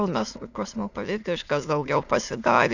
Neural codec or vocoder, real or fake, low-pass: autoencoder, 22.05 kHz, a latent of 192 numbers a frame, VITS, trained on many speakers; fake; 7.2 kHz